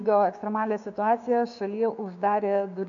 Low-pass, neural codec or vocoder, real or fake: 7.2 kHz; codec, 16 kHz, 6 kbps, DAC; fake